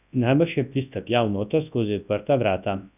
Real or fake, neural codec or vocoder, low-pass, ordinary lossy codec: fake; codec, 24 kHz, 0.9 kbps, WavTokenizer, large speech release; 3.6 kHz; none